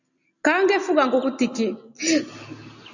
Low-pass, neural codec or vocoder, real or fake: 7.2 kHz; none; real